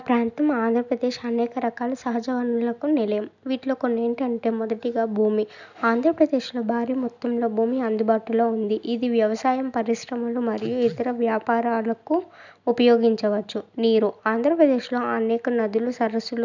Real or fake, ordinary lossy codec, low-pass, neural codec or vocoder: real; none; 7.2 kHz; none